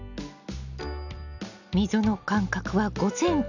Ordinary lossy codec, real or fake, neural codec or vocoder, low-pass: none; real; none; 7.2 kHz